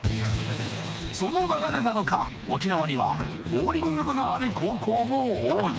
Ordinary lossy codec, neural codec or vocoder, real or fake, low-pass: none; codec, 16 kHz, 2 kbps, FreqCodec, smaller model; fake; none